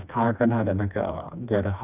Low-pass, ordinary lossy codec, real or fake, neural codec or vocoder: 3.6 kHz; none; fake; codec, 16 kHz, 2 kbps, FreqCodec, smaller model